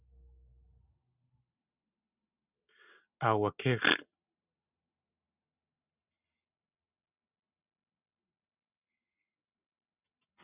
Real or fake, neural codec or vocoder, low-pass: real; none; 3.6 kHz